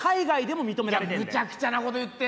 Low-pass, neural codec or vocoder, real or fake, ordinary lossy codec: none; none; real; none